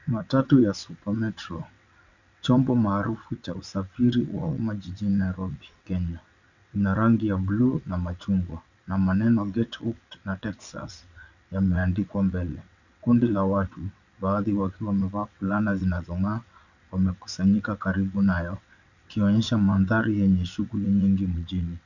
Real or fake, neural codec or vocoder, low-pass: fake; vocoder, 22.05 kHz, 80 mel bands, WaveNeXt; 7.2 kHz